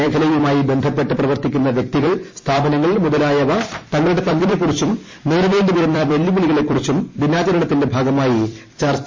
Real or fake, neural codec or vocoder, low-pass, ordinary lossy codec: fake; vocoder, 44.1 kHz, 128 mel bands every 256 samples, BigVGAN v2; 7.2 kHz; MP3, 32 kbps